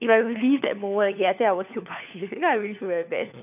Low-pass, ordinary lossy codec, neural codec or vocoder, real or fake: 3.6 kHz; none; codec, 16 kHz, 4 kbps, FunCodec, trained on LibriTTS, 50 frames a second; fake